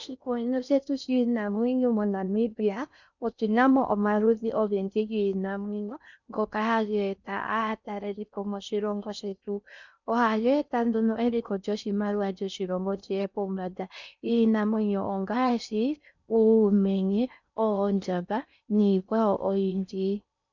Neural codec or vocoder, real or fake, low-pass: codec, 16 kHz in and 24 kHz out, 0.6 kbps, FocalCodec, streaming, 4096 codes; fake; 7.2 kHz